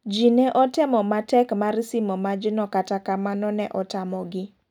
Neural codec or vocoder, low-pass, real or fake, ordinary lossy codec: none; 19.8 kHz; real; none